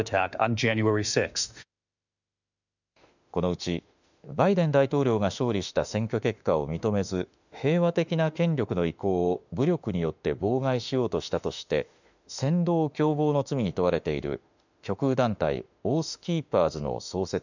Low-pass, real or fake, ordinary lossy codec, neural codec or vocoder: 7.2 kHz; fake; none; autoencoder, 48 kHz, 32 numbers a frame, DAC-VAE, trained on Japanese speech